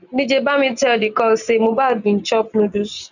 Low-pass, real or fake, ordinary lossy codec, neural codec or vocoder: 7.2 kHz; real; none; none